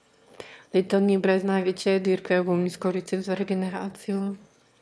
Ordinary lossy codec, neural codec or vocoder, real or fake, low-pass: none; autoencoder, 22.05 kHz, a latent of 192 numbers a frame, VITS, trained on one speaker; fake; none